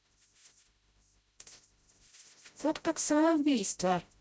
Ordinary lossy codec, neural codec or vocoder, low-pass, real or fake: none; codec, 16 kHz, 0.5 kbps, FreqCodec, smaller model; none; fake